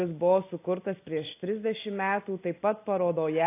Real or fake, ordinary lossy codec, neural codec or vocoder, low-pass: real; AAC, 24 kbps; none; 3.6 kHz